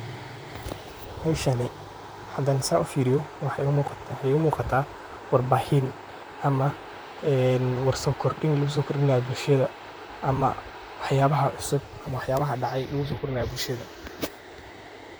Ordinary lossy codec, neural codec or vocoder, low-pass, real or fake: none; vocoder, 44.1 kHz, 128 mel bands, Pupu-Vocoder; none; fake